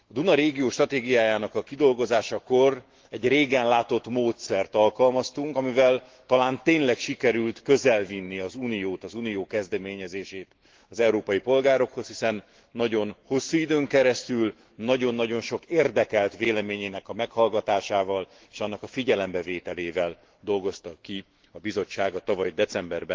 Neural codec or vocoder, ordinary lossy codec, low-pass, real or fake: autoencoder, 48 kHz, 128 numbers a frame, DAC-VAE, trained on Japanese speech; Opus, 16 kbps; 7.2 kHz; fake